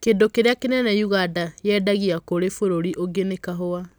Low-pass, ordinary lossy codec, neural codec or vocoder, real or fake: none; none; none; real